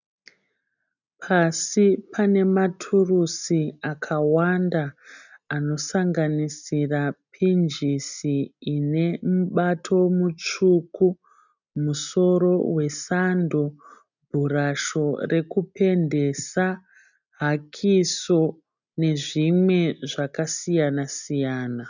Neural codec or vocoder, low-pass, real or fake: none; 7.2 kHz; real